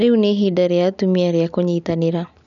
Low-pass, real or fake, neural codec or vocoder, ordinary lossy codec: 7.2 kHz; real; none; none